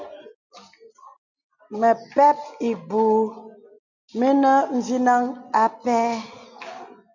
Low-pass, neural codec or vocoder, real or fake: 7.2 kHz; none; real